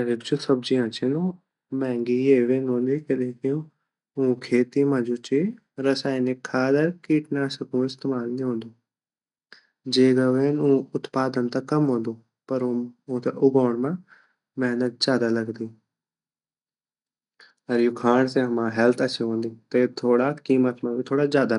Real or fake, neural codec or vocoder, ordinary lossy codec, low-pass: real; none; none; 10.8 kHz